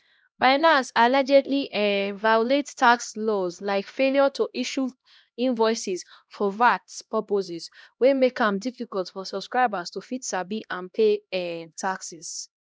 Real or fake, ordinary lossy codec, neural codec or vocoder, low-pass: fake; none; codec, 16 kHz, 1 kbps, X-Codec, HuBERT features, trained on LibriSpeech; none